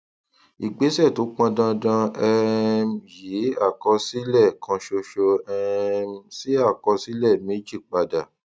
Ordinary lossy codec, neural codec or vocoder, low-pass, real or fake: none; none; none; real